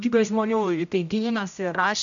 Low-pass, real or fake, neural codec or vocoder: 7.2 kHz; fake; codec, 16 kHz, 0.5 kbps, X-Codec, HuBERT features, trained on general audio